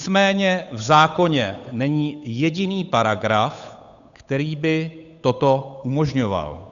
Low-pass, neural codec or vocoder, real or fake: 7.2 kHz; codec, 16 kHz, 8 kbps, FunCodec, trained on Chinese and English, 25 frames a second; fake